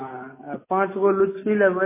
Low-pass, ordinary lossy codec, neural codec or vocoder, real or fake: 3.6 kHz; MP3, 16 kbps; none; real